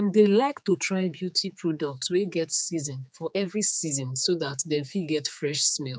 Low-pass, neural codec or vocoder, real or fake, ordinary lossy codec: none; codec, 16 kHz, 4 kbps, X-Codec, HuBERT features, trained on general audio; fake; none